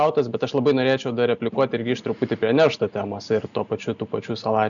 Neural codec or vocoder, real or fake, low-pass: none; real; 7.2 kHz